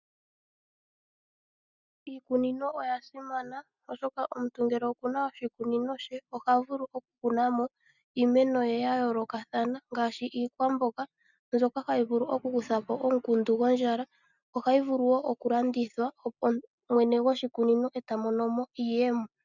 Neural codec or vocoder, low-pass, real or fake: none; 7.2 kHz; real